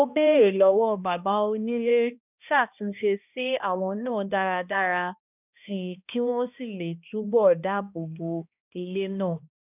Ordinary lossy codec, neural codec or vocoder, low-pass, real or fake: none; codec, 16 kHz, 1 kbps, X-Codec, HuBERT features, trained on balanced general audio; 3.6 kHz; fake